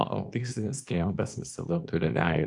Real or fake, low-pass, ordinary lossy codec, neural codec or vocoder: fake; 10.8 kHz; AAC, 64 kbps; codec, 24 kHz, 0.9 kbps, WavTokenizer, small release